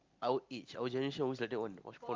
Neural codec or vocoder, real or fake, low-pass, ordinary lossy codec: none; real; 7.2 kHz; Opus, 32 kbps